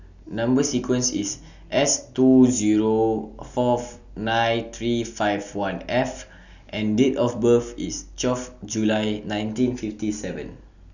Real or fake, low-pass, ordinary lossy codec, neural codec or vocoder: real; 7.2 kHz; none; none